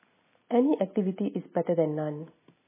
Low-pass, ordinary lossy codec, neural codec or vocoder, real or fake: 3.6 kHz; MP3, 16 kbps; none; real